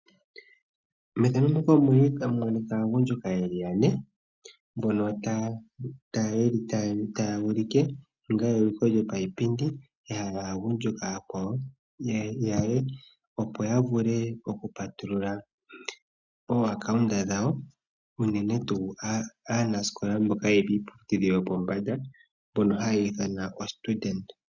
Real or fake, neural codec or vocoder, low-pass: real; none; 7.2 kHz